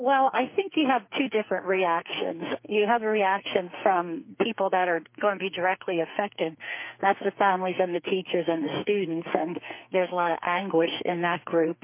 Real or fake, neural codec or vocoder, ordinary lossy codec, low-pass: fake; codec, 44.1 kHz, 2.6 kbps, SNAC; MP3, 24 kbps; 3.6 kHz